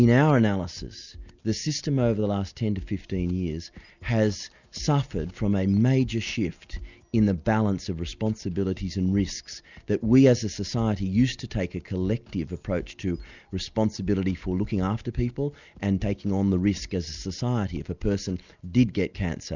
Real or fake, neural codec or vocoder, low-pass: real; none; 7.2 kHz